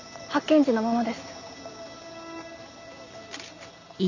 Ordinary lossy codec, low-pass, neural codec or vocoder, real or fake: none; 7.2 kHz; none; real